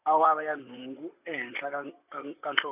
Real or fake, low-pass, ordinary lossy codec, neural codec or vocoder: fake; 3.6 kHz; none; vocoder, 44.1 kHz, 128 mel bands, Pupu-Vocoder